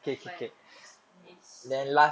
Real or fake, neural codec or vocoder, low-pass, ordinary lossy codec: real; none; none; none